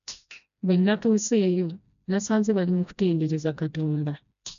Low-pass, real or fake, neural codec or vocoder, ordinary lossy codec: 7.2 kHz; fake; codec, 16 kHz, 1 kbps, FreqCodec, smaller model; none